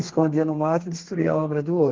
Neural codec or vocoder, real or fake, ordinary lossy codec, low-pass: codec, 32 kHz, 1.9 kbps, SNAC; fake; Opus, 16 kbps; 7.2 kHz